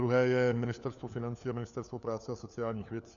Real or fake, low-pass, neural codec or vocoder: fake; 7.2 kHz; codec, 16 kHz, 4 kbps, FunCodec, trained on LibriTTS, 50 frames a second